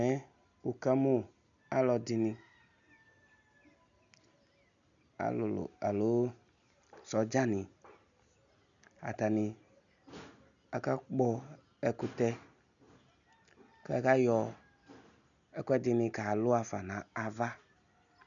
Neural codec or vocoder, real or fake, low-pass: none; real; 7.2 kHz